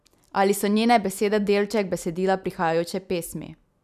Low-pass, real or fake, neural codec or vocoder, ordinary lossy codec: 14.4 kHz; real; none; none